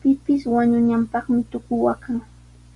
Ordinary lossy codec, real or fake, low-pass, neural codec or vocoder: Opus, 64 kbps; real; 10.8 kHz; none